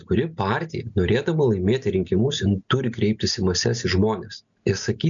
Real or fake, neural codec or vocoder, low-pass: real; none; 7.2 kHz